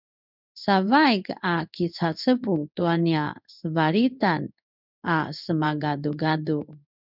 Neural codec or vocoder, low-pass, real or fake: codec, 16 kHz in and 24 kHz out, 1 kbps, XY-Tokenizer; 5.4 kHz; fake